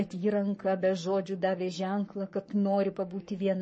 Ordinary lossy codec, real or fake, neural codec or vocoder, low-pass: MP3, 32 kbps; fake; codec, 44.1 kHz, 7.8 kbps, Pupu-Codec; 10.8 kHz